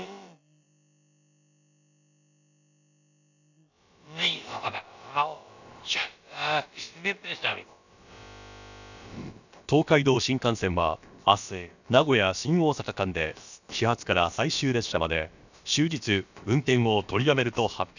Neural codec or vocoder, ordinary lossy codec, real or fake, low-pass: codec, 16 kHz, about 1 kbps, DyCAST, with the encoder's durations; none; fake; 7.2 kHz